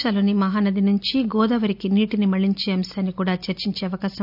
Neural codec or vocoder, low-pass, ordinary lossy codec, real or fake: none; 5.4 kHz; none; real